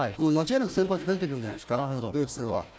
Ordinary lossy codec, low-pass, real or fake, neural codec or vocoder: none; none; fake; codec, 16 kHz, 1 kbps, FunCodec, trained on Chinese and English, 50 frames a second